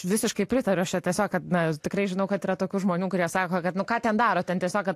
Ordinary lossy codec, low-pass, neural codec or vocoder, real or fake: AAC, 64 kbps; 14.4 kHz; none; real